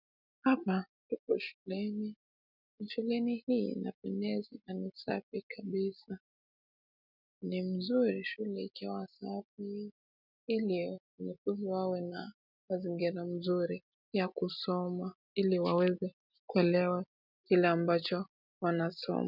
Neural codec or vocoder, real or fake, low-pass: none; real; 5.4 kHz